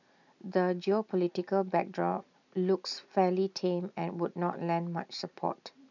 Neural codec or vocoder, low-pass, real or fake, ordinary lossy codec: none; 7.2 kHz; real; none